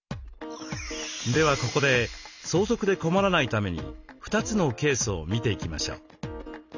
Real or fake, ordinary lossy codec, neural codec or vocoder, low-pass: real; none; none; 7.2 kHz